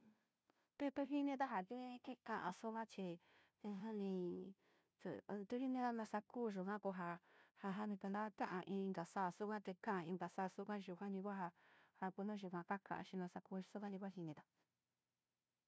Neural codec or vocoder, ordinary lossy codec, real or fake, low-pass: codec, 16 kHz, 0.5 kbps, FunCodec, trained on Chinese and English, 25 frames a second; none; fake; none